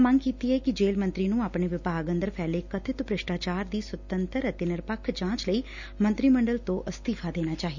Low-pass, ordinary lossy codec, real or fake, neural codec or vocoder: 7.2 kHz; none; real; none